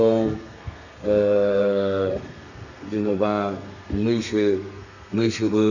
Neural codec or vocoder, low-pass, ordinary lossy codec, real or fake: codec, 32 kHz, 1.9 kbps, SNAC; 7.2 kHz; none; fake